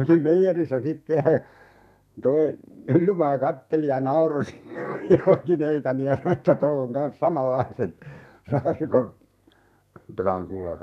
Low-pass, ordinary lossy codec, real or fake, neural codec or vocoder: 14.4 kHz; none; fake; codec, 32 kHz, 1.9 kbps, SNAC